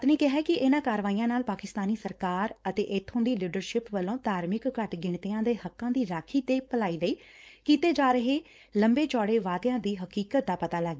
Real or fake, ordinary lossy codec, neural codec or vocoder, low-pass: fake; none; codec, 16 kHz, 4.8 kbps, FACodec; none